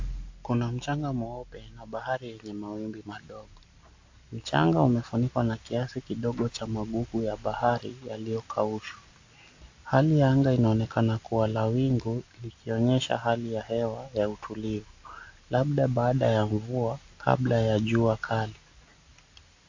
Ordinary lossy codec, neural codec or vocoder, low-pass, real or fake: AAC, 48 kbps; none; 7.2 kHz; real